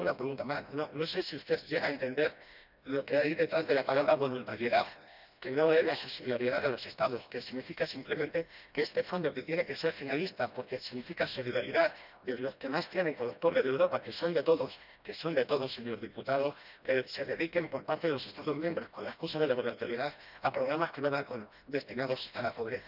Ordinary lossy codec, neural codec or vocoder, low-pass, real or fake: none; codec, 16 kHz, 1 kbps, FreqCodec, smaller model; 5.4 kHz; fake